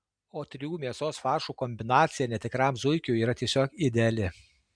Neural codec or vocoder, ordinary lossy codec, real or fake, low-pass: none; MP3, 96 kbps; real; 9.9 kHz